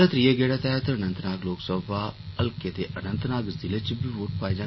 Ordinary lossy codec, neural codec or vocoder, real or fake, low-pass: MP3, 24 kbps; none; real; 7.2 kHz